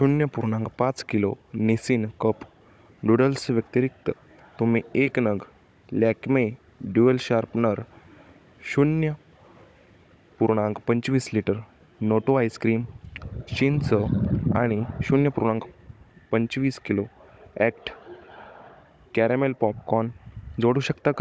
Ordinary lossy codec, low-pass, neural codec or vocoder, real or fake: none; none; codec, 16 kHz, 16 kbps, FunCodec, trained on Chinese and English, 50 frames a second; fake